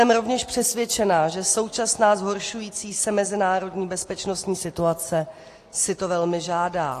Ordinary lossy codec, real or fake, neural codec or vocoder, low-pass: AAC, 48 kbps; real; none; 14.4 kHz